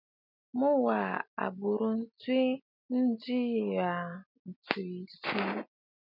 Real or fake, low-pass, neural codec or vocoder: real; 5.4 kHz; none